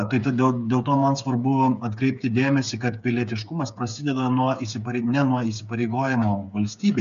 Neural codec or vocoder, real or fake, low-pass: codec, 16 kHz, 8 kbps, FreqCodec, smaller model; fake; 7.2 kHz